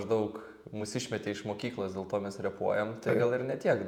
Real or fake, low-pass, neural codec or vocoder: fake; 19.8 kHz; vocoder, 48 kHz, 128 mel bands, Vocos